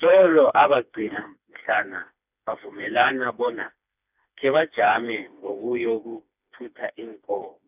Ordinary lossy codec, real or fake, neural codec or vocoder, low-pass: none; fake; codec, 16 kHz, 2 kbps, FreqCodec, smaller model; 3.6 kHz